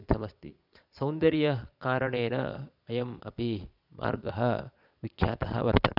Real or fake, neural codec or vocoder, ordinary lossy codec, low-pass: fake; vocoder, 22.05 kHz, 80 mel bands, Vocos; none; 5.4 kHz